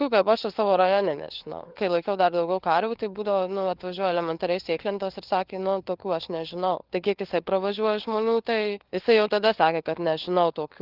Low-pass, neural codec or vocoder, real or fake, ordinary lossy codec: 5.4 kHz; codec, 16 kHz, 4 kbps, FunCodec, trained on LibriTTS, 50 frames a second; fake; Opus, 16 kbps